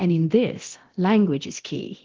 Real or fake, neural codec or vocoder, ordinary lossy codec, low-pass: fake; codec, 24 kHz, 0.9 kbps, DualCodec; Opus, 16 kbps; 7.2 kHz